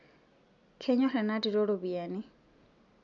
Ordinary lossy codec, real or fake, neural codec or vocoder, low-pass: MP3, 96 kbps; real; none; 7.2 kHz